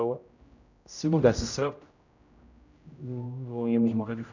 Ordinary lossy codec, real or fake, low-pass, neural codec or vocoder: none; fake; 7.2 kHz; codec, 16 kHz, 0.5 kbps, X-Codec, HuBERT features, trained on general audio